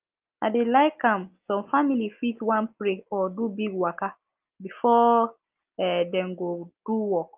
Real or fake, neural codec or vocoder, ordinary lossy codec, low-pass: real; none; Opus, 24 kbps; 3.6 kHz